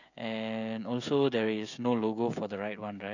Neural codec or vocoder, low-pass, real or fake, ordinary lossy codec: codec, 16 kHz, 16 kbps, FreqCodec, smaller model; 7.2 kHz; fake; none